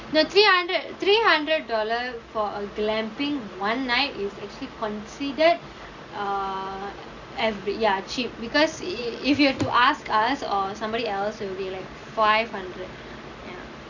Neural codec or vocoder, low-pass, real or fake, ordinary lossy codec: none; 7.2 kHz; real; none